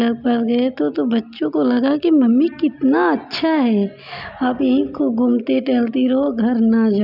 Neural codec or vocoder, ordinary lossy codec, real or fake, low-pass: none; none; real; 5.4 kHz